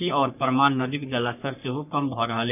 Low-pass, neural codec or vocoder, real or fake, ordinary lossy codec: 3.6 kHz; codec, 44.1 kHz, 3.4 kbps, Pupu-Codec; fake; none